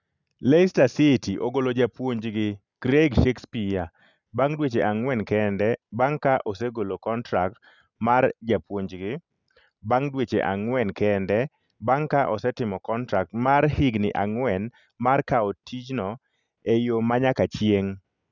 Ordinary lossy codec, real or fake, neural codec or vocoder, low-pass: none; real; none; 7.2 kHz